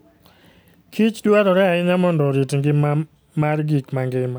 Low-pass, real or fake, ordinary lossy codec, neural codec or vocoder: none; real; none; none